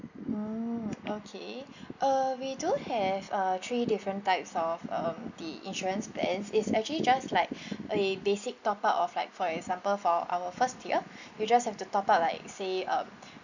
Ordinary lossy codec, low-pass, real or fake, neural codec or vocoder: none; 7.2 kHz; real; none